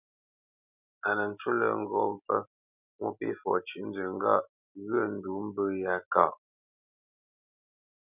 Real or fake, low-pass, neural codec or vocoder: real; 3.6 kHz; none